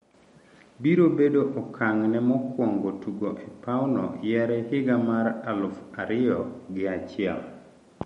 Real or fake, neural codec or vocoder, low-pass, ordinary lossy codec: real; none; 19.8 kHz; MP3, 48 kbps